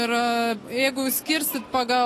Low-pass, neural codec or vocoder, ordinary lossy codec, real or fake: 14.4 kHz; none; AAC, 48 kbps; real